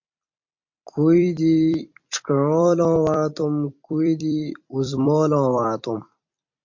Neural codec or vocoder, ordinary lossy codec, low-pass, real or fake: vocoder, 44.1 kHz, 128 mel bands every 256 samples, BigVGAN v2; MP3, 48 kbps; 7.2 kHz; fake